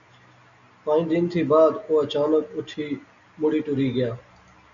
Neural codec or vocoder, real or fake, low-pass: none; real; 7.2 kHz